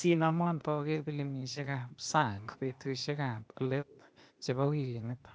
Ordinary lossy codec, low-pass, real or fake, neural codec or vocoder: none; none; fake; codec, 16 kHz, 0.8 kbps, ZipCodec